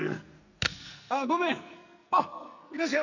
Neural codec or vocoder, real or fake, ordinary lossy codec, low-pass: codec, 44.1 kHz, 2.6 kbps, SNAC; fake; none; 7.2 kHz